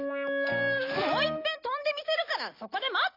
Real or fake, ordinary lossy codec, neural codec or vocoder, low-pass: real; AAC, 32 kbps; none; 5.4 kHz